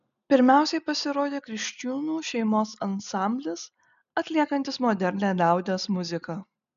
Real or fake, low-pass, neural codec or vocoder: real; 7.2 kHz; none